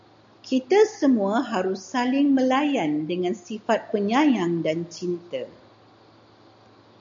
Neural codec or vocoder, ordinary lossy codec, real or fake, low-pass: none; MP3, 64 kbps; real; 7.2 kHz